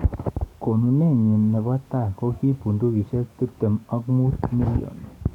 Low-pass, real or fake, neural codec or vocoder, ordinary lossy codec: 19.8 kHz; fake; codec, 44.1 kHz, 7.8 kbps, DAC; none